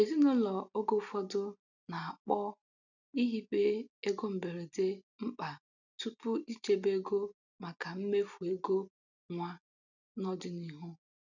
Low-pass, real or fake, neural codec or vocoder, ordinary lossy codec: 7.2 kHz; real; none; none